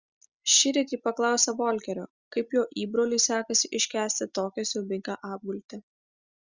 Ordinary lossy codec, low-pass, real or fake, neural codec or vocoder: Opus, 64 kbps; 7.2 kHz; real; none